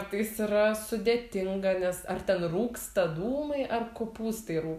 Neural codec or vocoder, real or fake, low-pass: none; real; 14.4 kHz